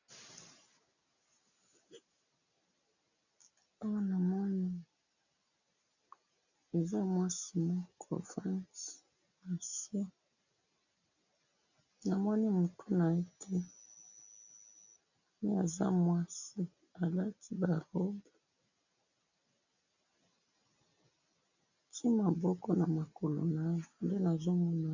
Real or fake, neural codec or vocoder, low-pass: real; none; 7.2 kHz